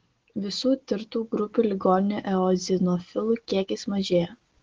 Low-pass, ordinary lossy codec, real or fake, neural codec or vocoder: 7.2 kHz; Opus, 16 kbps; real; none